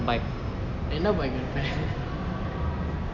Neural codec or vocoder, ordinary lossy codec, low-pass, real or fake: none; none; 7.2 kHz; real